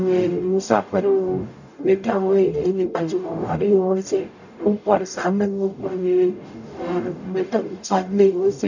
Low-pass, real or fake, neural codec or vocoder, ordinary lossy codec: 7.2 kHz; fake; codec, 44.1 kHz, 0.9 kbps, DAC; none